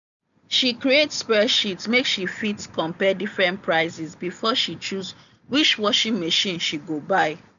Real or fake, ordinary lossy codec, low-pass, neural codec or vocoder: real; none; 7.2 kHz; none